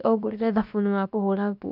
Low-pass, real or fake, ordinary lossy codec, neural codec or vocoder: 5.4 kHz; fake; none; codec, 16 kHz, about 1 kbps, DyCAST, with the encoder's durations